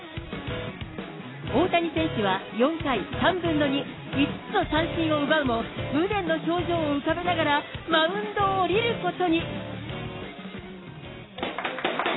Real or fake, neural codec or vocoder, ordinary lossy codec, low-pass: real; none; AAC, 16 kbps; 7.2 kHz